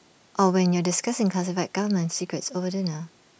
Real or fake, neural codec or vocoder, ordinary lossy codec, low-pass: real; none; none; none